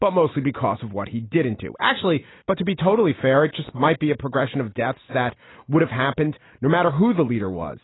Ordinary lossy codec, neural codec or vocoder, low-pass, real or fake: AAC, 16 kbps; none; 7.2 kHz; real